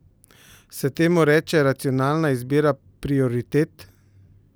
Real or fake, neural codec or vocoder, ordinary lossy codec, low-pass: real; none; none; none